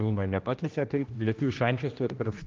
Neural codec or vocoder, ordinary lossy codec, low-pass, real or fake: codec, 16 kHz, 1 kbps, X-Codec, HuBERT features, trained on general audio; Opus, 24 kbps; 7.2 kHz; fake